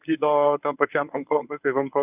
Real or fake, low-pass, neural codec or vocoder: fake; 3.6 kHz; codec, 24 kHz, 0.9 kbps, WavTokenizer, medium speech release version 1